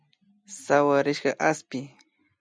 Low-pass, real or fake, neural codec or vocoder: 9.9 kHz; real; none